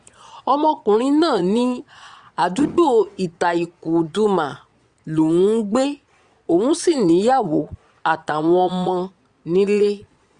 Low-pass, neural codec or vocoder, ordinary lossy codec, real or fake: 9.9 kHz; vocoder, 22.05 kHz, 80 mel bands, Vocos; Opus, 64 kbps; fake